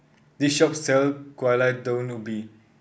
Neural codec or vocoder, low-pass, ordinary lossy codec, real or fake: none; none; none; real